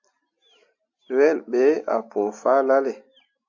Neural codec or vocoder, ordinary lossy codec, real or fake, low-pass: none; AAC, 48 kbps; real; 7.2 kHz